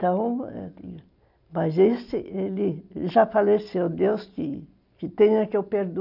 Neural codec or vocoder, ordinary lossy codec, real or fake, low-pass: none; none; real; 5.4 kHz